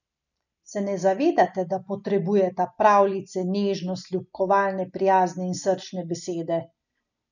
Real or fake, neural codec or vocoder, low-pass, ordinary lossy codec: real; none; 7.2 kHz; none